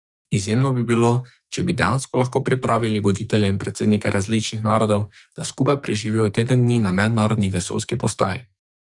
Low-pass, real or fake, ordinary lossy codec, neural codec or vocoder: 10.8 kHz; fake; none; codec, 44.1 kHz, 2.6 kbps, SNAC